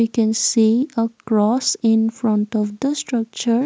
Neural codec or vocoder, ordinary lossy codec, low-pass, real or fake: none; none; none; real